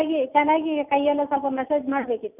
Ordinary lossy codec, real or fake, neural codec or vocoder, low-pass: none; real; none; 3.6 kHz